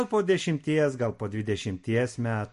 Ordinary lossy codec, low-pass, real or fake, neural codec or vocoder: MP3, 48 kbps; 14.4 kHz; real; none